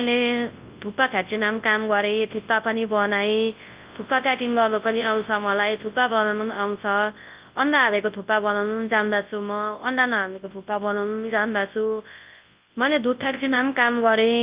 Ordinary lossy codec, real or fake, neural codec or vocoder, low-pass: Opus, 32 kbps; fake; codec, 24 kHz, 0.9 kbps, WavTokenizer, large speech release; 3.6 kHz